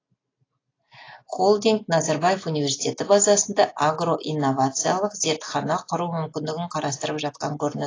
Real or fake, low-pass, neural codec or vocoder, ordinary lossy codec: real; 7.2 kHz; none; AAC, 32 kbps